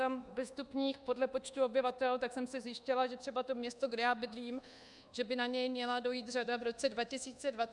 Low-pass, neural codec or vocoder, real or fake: 10.8 kHz; codec, 24 kHz, 1.2 kbps, DualCodec; fake